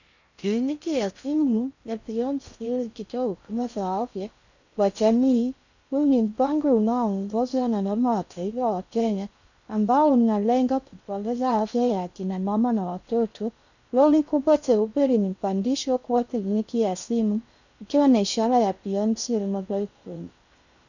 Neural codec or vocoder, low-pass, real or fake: codec, 16 kHz in and 24 kHz out, 0.6 kbps, FocalCodec, streaming, 4096 codes; 7.2 kHz; fake